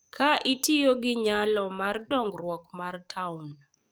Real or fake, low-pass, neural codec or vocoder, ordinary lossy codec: fake; none; codec, 44.1 kHz, 7.8 kbps, DAC; none